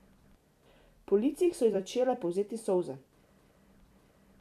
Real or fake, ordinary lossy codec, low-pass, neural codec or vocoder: fake; MP3, 96 kbps; 14.4 kHz; vocoder, 44.1 kHz, 128 mel bands every 256 samples, BigVGAN v2